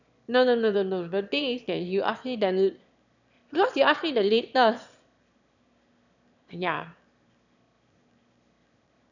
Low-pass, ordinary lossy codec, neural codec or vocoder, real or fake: 7.2 kHz; none; autoencoder, 22.05 kHz, a latent of 192 numbers a frame, VITS, trained on one speaker; fake